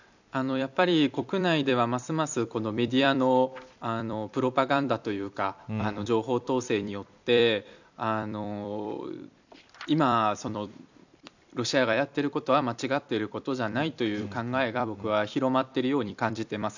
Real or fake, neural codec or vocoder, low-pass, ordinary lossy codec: fake; vocoder, 44.1 kHz, 128 mel bands every 256 samples, BigVGAN v2; 7.2 kHz; none